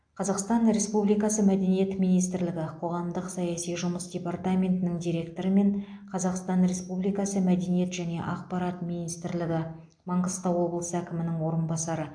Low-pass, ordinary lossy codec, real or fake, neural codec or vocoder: 9.9 kHz; AAC, 64 kbps; real; none